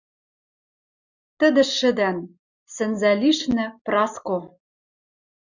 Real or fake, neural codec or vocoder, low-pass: real; none; 7.2 kHz